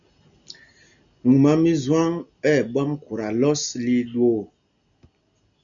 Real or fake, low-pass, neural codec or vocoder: real; 7.2 kHz; none